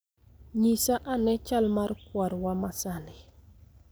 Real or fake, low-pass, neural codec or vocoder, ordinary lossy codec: real; none; none; none